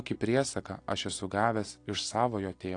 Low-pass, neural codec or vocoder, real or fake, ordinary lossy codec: 9.9 kHz; none; real; AAC, 48 kbps